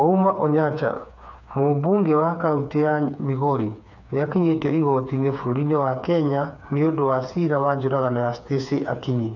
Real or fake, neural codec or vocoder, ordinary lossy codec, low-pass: fake; codec, 16 kHz, 4 kbps, FreqCodec, smaller model; none; 7.2 kHz